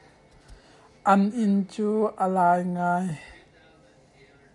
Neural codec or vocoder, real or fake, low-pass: none; real; 10.8 kHz